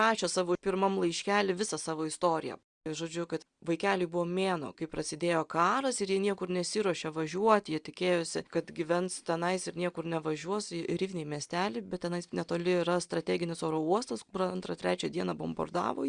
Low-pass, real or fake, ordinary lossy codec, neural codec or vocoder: 9.9 kHz; real; Opus, 64 kbps; none